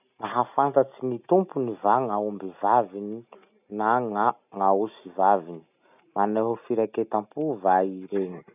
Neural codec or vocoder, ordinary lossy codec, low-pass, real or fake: none; none; 3.6 kHz; real